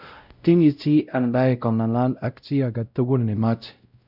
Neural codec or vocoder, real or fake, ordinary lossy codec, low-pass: codec, 16 kHz, 0.5 kbps, X-Codec, WavLM features, trained on Multilingual LibriSpeech; fake; none; 5.4 kHz